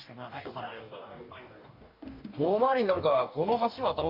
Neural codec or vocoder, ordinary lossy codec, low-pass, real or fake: codec, 44.1 kHz, 2.6 kbps, DAC; Opus, 64 kbps; 5.4 kHz; fake